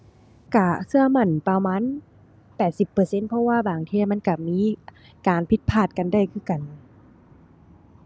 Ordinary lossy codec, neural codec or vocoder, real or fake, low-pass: none; none; real; none